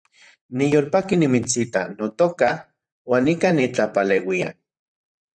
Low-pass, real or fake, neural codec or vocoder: 9.9 kHz; fake; vocoder, 44.1 kHz, 128 mel bands, Pupu-Vocoder